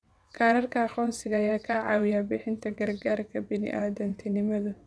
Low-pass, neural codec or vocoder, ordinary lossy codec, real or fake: none; vocoder, 22.05 kHz, 80 mel bands, WaveNeXt; none; fake